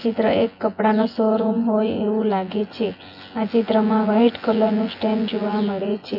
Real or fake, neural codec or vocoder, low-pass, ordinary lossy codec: fake; vocoder, 24 kHz, 100 mel bands, Vocos; 5.4 kHz; AAC, 48 kbps